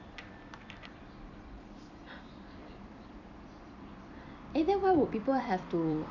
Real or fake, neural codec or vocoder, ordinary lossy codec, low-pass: fake; vocoder, 44.1 kHz, 128 mel bands every 256 samples, BigVGAN v2; none; 7.2 kHz